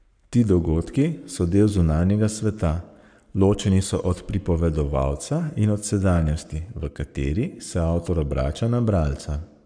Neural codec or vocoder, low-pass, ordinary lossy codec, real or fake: codec, 44.1 kHz, 7.8 kbps, Pupu-Codec; 9.9 kHz; none; fake